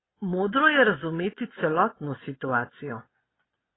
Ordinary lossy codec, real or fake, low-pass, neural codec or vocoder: AAC, 16 kbps; fake; 7.2 kHz; vocoder, 24 kHz, 100 mel bands, Vocos